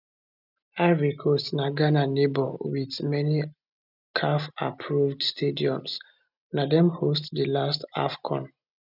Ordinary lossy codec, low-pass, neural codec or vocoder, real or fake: none; 5.4 kHz; none; real